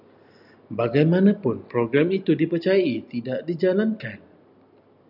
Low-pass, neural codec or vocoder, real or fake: 5.4 kHz; none; real